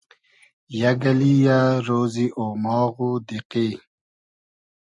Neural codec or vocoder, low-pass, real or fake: none; 10.8 kHz; real